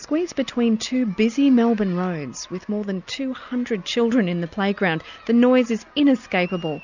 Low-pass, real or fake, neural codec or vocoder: 7.2 kHz; real; none